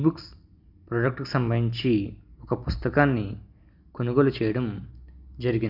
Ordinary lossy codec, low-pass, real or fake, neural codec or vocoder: Opus, 64 kbps; 5.4 kHz; real; none